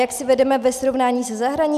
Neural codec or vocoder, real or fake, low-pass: none; real; 14.4 kHz